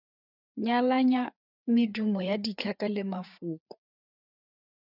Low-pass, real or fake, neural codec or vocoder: 5.4 kHz; fake; codec, 16 kHz, 4 kbps, FreqCodec, larger model